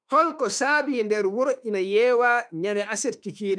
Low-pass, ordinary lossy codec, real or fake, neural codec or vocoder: 9.9 kHz; none; fake; autoencoder, 48 kHz, 32 numbers a frame, DAC-VAE, trained on Japanese speech